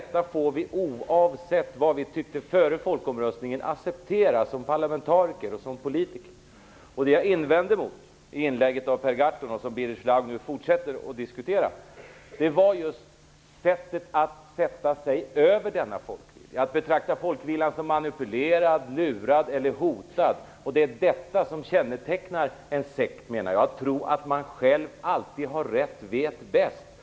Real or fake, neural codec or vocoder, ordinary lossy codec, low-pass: real; none; none; none